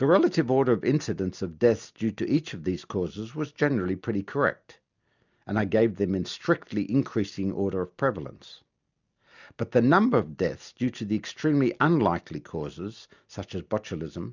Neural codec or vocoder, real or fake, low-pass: none; real; 7.2 kHz